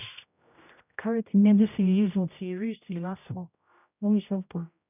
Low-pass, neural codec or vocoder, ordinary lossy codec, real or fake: 3.6 kHz; codec, 16 kHz, 0.5 kbps, X-Codec, HuBERT features, trained on general audio; none; fake